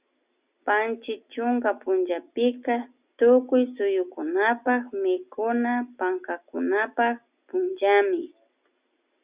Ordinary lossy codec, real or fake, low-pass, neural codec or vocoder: Opus, 64 kbps; real; 3.6 kHz; none